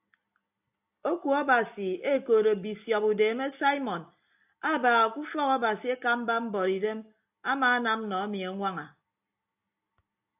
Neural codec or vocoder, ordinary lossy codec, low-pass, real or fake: none; AAC, 32 kbps; 3.6 kHz; real